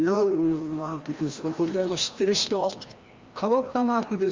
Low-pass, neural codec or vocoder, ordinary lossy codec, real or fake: 7.2 kHz; codec, 16 kHz, 1 kbps, FreqCodec, larger model; Opus, 32 kbps; fake